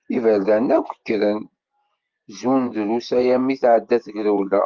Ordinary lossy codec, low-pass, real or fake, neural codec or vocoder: Opus, 32 kbps; 7.2 kHz; fake; vocoder, 24 kHz, 100 mel bands, Vocos